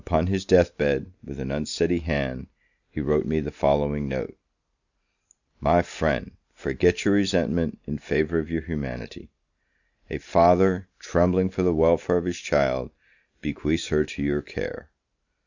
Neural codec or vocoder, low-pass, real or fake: none; 7.2 kHz; real